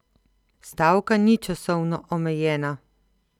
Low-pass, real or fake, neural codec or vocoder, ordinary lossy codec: 19.8 kHz; real; none; none